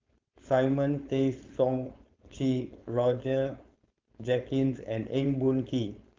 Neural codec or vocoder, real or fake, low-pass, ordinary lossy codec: codec, 16 kHz, 4.8 kbps, FACodec; fake; 7.2 kHz; Opus, 24 kbps